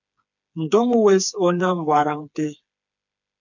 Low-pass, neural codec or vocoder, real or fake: 7.2 kHz; codec, 16 kHz, 4 kbps, FreqCodec, smaller model; fake